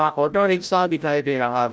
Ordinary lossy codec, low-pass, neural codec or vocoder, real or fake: none; none; codec, 16 kHz, 0.5 kbps, FreqCodec, larger model; fake